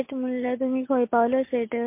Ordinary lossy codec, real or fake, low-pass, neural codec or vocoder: MP3, 24 kbps; real; 3.6 kHz; none